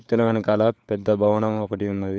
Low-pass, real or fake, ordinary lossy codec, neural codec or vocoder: none; fake; none; codec, 16 kHz, 4 kbps, FunCodec, trained on LibriTTS, 50 frames a second